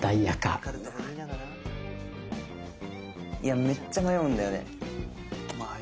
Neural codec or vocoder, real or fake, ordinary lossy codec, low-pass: none; real; none; none